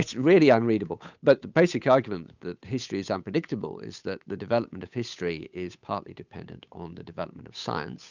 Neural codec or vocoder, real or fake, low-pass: codec, 16 kHz, 8 kbps, FunCodec, trained on Chinese and English, 25 frames a second; fake; 7.2 kHz